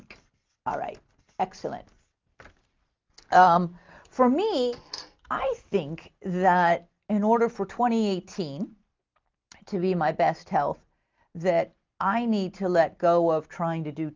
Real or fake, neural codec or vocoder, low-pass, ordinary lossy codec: real; none; 7.2 kHz; Opus, 24 kbps